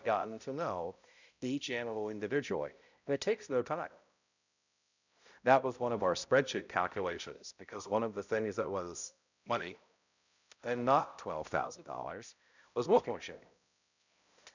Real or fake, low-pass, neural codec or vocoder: fake; 7.2 kHz; codec, 16 kHz, 0.5 kbps, X-Codec, HuBERT features, trained on balanced general audio